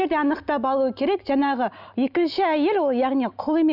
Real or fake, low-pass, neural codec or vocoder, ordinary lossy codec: real; 5.4 kHz; none; none